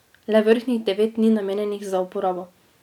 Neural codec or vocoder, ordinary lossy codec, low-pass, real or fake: none; none; 19.8 kHz; real